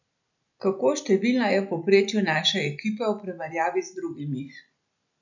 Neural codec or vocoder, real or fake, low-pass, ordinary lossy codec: none; real; 7.2 kHz; none